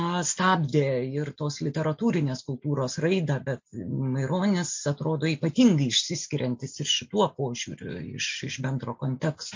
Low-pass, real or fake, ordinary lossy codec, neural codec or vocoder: 7.2 kHz; real; MP3, 48 kbps; none